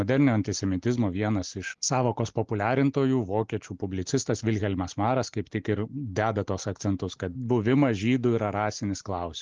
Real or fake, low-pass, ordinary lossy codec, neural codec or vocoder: real; 7.2 kHz; Opus, 32 kbps; none